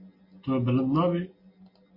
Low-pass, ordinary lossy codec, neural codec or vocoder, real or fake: 5.4 kHz; MP3, 32 kbps; none; real